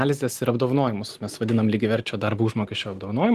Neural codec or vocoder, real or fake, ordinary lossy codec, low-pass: none; real; Opus, 24 kbps; 14.4 kHz